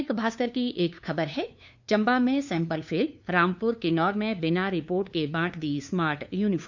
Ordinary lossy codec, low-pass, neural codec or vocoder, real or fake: none; 7.2 kHz; codec, 16 kHz, 2 kbps, FunCodec, trained on LibriTTS, 25 frames a second; fake